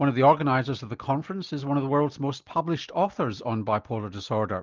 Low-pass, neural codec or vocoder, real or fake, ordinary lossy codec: 7.2 kHz; none; real; Opus, 24 kbps